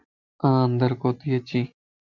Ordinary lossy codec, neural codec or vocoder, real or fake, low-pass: Opus, 64 kbps; none; real; 7.2 kHz